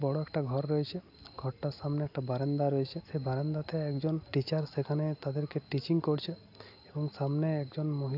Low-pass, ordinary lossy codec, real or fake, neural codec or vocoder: 5.4 kHz; AAC, 48 kbps; real; none